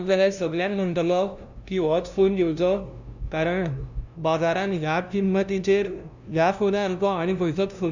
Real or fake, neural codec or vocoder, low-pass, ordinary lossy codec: fake; codec, 16 kHz, 0.5 kbps, FunCodec, trained on LibriTTS, 25 frames a second; 7.2 kHz; none